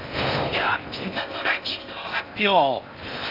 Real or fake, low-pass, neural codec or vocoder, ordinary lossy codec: fake; 5.4 kHz; codec, 16 kHz in and 24 kHz out, 0.6 kbps, FocalCodec, streaming, 4096 codes; none